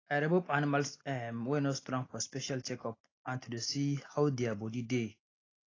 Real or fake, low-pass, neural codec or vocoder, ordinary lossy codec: real; 7.2 kHz; none; AAC, 32 kbps